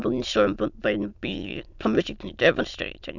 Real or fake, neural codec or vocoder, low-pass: fake; autoencoder, 22.05 kHz, a latent of 192 numbers a frame, VITS, trained on many speakers; 7.2 kHz